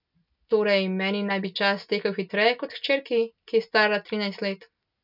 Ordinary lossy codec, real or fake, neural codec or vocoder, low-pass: none; real; none; 5.4 kHz